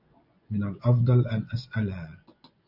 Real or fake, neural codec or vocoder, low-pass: fake; vocoder, 24 kHz, 100 mel bands, Vocos; 5.4 kHz